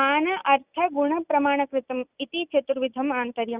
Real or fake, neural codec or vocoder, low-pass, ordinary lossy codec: real; none; 3.6 kHz; Opus, 64 kbps